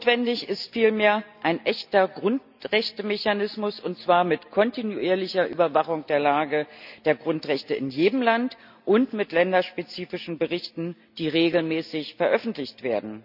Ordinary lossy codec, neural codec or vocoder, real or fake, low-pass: none; none; real; 5.4 kHz